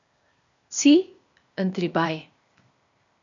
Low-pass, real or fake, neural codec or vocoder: 7.2 kHz; fake; codec, 16 kHz, 0.8 kbps, ZipCodec